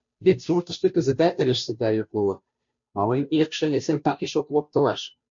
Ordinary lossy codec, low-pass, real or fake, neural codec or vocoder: MP3, 48 kbps; 7.2 kHz; fake; codec, 16 kHz, 0.5 kbps, FunCodec, trained on Chinese and English, 25 frames a second